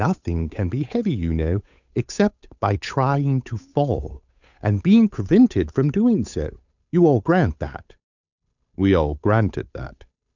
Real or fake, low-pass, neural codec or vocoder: fake; 7.2 kHz; codec, 16 kHz, 8 kbps, FunCodec, trained on Chinese and English, 25 frames a second